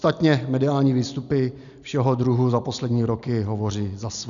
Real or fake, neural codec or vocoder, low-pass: real; none; 7.2 kHz